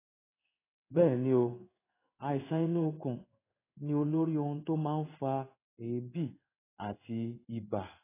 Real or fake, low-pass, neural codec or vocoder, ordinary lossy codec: fake; 3.6 kHz; codec, 16 kHz in and 24 kHz out, 1 kbps, XY-Tokenizer; AAC, 16 kbps